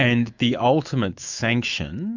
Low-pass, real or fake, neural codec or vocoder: 7.2 kHz; fake; autoencoder, 48 kHz, 128 numbers a frame, DAC-VAE, trained on Japanese speech